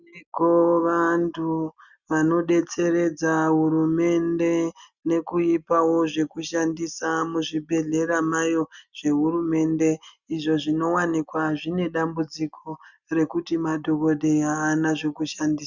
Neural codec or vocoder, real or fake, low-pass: none; real; 7.2 kHz